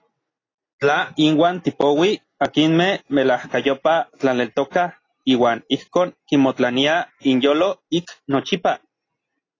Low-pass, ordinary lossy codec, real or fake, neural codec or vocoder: 7.2 kHz; AAC, 32 kbps; real; none